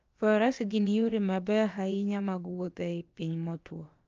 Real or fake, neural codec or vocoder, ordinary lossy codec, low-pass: fake; codec, 16 kHz, about 1 kbps, DyCAST, with the encoder's durations; Opus, 24 kbps; 7.2 kHz